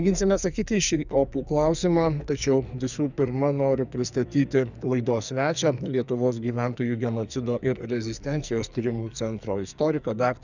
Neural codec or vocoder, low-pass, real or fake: codec, 44.1 kHz, 2.6 kbps, SNAC; 7.2 kHz; fake